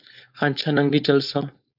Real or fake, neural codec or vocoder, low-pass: fake; codec, 16 kHz, 4.8 kbps, FACodec; 5.4 kHz